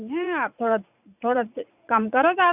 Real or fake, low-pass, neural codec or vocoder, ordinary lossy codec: fake; 3.6 kHz; vocoder, 44.1 kHz, 80 mel bands, Vocos; none